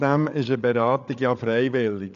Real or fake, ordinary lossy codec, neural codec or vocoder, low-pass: fake; none; codec, 16 kHz, 8 kbps, FreqCodec, larger model; 7.2 kHz